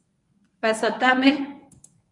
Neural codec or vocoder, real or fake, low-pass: codec, 24 kHz, 0.9 kbps, WavTokenizer, medium speech release version 1; fake; 10.8 kHz